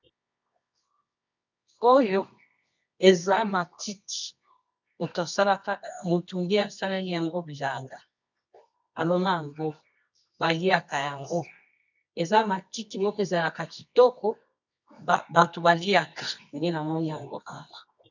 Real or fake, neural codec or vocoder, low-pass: fake; codec, 24 kHz, 0.9 kbps, WavTokenizer, medium music audio release; 7.2 kHz